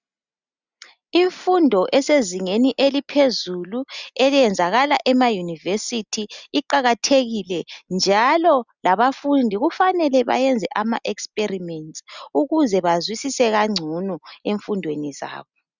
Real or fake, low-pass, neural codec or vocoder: real; 7.2 kHz; none